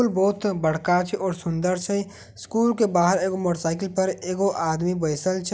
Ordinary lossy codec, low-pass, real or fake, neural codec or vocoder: none; none; real; none